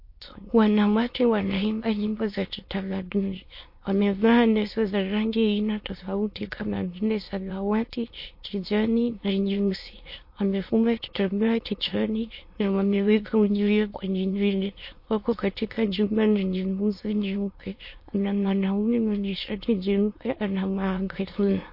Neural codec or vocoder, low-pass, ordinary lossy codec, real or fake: autoencoder, 22.05 kHz, a latent of 192 numbers a frame, VITS, trained on many speakers; 5.4 kHz; MP3, 32 kbps; fake